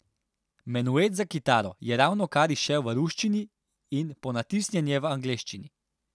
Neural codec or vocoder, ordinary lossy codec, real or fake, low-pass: none; none; real; none